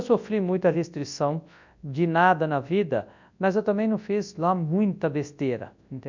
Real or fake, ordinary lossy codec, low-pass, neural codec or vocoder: fake; MP3, 64 kbps; 7.2 kHz; codec, 24 kHz, 0.9 kbps, WavTokenizer, large speech release